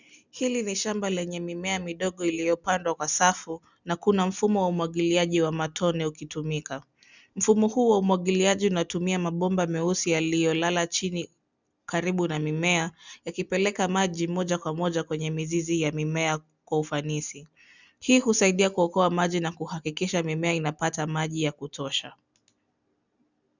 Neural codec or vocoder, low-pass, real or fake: none; 7.2 kHz; real